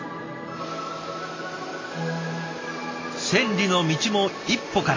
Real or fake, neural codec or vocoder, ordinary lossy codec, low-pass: real; none; AAC, 32 kbps; 7.2 kHz